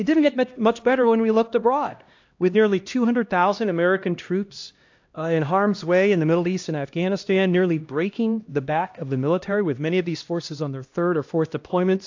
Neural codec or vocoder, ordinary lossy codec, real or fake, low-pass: codec, 16 kHz, 1 kbps, X-Codec, HuBERT features, trained on LibriSpeech; AAC, 48 kbps; fake; 7.2 kHz